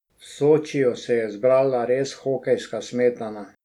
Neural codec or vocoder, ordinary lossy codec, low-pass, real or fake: none; none; 19.8 kHz; real